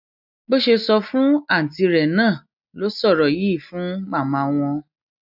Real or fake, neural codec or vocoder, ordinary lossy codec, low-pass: real; none; none; 5.4 kHz